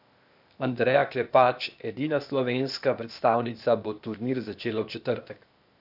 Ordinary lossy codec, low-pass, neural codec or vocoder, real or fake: none; 5.4 kHz; codec, 16 kHz, 0.8 kbps, ZipCodec; fake